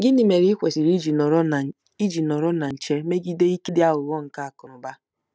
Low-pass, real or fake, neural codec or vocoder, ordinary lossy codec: none; real; none; none